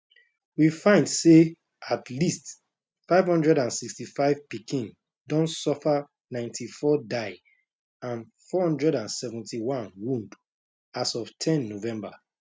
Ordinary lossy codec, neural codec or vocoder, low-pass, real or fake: none; none; none; real